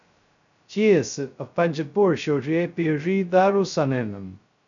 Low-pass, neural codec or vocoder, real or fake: 7.2 kHz; codec, 16 kHz, 0.2 kbps, FocalCodec; fake